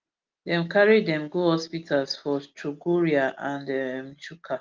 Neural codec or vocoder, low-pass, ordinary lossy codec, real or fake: none; 7.2 kHz; Opus, 32 kbps; real